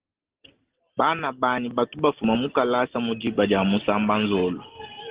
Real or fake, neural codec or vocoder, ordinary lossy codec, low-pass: real; none; Opus, 16 kbps; 3.6 kHz